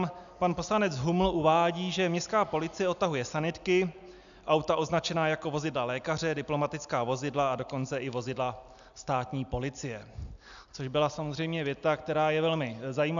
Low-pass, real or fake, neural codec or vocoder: 7.2 kHz; real; none